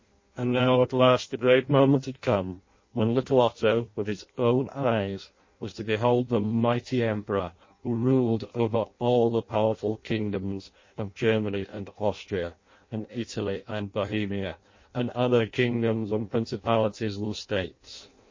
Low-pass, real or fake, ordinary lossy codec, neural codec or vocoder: 7.2 kHz; fake; MP3, 32 kbps; codec, 16 kHz in and 24 kHz out, 0.6 kbps, FireRedTTS-2 codec